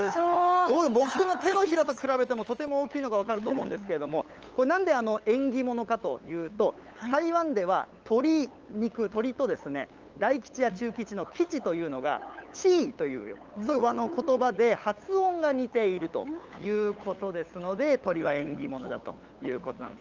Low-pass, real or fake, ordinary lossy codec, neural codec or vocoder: 7.2 kHz; fake; Opus, 24 kbps; codec, 16 kHz, 8 kbps, FunCodec, trained on LibriTTS, 25 frames a second